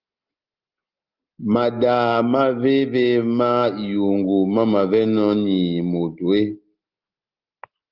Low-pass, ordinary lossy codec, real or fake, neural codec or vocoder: 5.4 kHz; Opus, 32 kbps; real; none